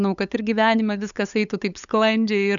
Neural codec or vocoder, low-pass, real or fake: codec, 16 kHz, 8 kbps, FunCodec, trained on LibriTTS, 25 frames a second; 7.2 kHz; fake